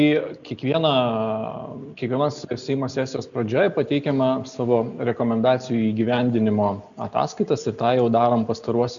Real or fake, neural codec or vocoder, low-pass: real; none; 7.2 kHz